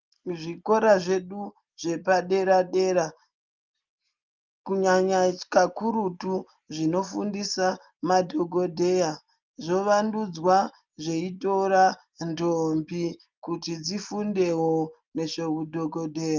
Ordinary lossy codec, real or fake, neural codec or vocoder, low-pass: Opus, 24 kbps; real; none; 7.2 kHz